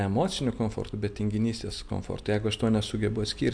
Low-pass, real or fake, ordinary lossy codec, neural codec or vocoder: 9.9 kHz; real; MP3, 64 kbps; none